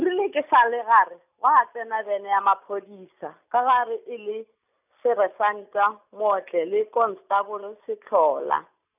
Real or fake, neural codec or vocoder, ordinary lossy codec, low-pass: real; none; none; 3.6 kHz